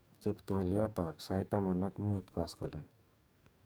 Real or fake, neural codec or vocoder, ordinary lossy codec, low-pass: fake; codec, 44.1 kHz, 2.6 kbps, DAC; none; none